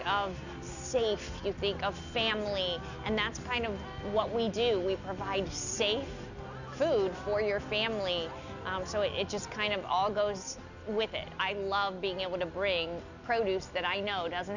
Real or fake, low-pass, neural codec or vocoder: real; 7.2 kHz; none